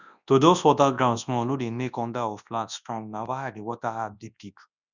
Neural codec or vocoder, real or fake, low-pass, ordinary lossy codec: codec, 24 kHz, 0.9 kbps, WavTokenizer, large speech release; fake; 7.2 kHz; none